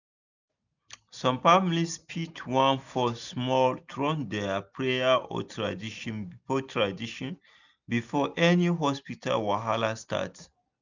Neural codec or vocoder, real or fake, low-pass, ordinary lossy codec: none; real; 7.2 kHz; none